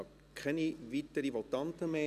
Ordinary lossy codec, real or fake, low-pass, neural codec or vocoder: none; real; 14.4 kHz; none